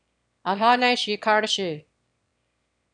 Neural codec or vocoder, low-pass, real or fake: autoencoder, 22.05 kHz, a latent of 192 numbers a frame, VITS, trained on one speaker; 9.9 kHz; fake